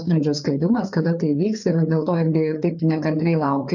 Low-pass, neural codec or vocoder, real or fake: 7.2 kHz; codec, 16 kHz, 4 kbps, FreqCodec, larger model; fake